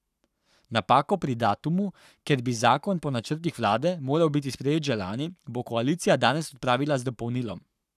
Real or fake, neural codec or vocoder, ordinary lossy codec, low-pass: fake; codec, 44.1 kHz, 7.8 kbps, Pupu-Codec; none; 14.4 kHz